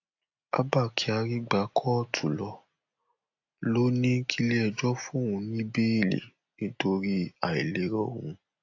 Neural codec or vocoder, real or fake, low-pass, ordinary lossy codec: none; real; 7.2 kHz; AAC, 48 kbps